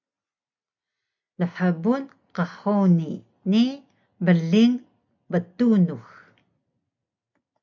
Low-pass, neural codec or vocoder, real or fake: 7.2 kHz; none; real